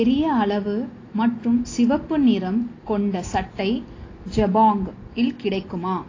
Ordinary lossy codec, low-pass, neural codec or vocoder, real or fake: AAC, 32 kbps; 7.2 kHz; none; real